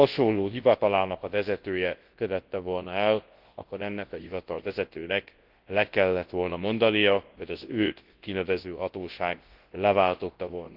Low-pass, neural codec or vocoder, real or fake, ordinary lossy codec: 5.4 kHz; codec, 24 kHz, 0.9 kbps, WavTokenizer, large speech release; fake; Opus, 16 kbps